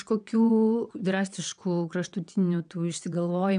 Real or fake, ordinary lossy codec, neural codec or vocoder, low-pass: fake; MP3, 96 kbps; vocoder, 22.05 kHz, 80 mel bands, WaveNeXt; 9.9 kHz